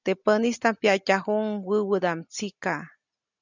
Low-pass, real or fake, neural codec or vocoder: 7.2 kHz; real; none